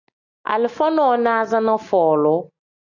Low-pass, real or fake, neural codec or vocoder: 7.2 kHz; real; none